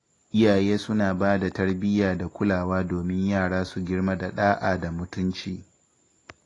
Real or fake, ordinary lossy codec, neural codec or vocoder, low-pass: real; AAC, 32 kbps; none; 10.8 kHz